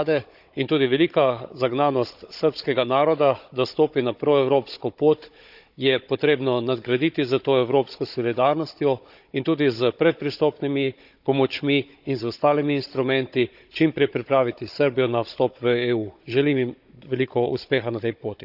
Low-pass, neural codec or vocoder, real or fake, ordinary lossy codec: 5.4 kHz; codec, 16 kHz, 16 kbps, FunCodec, trained on Chinese and English, 50 frames a second; fake; none